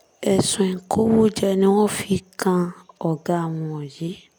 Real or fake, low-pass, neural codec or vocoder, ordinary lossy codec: real; none; none; none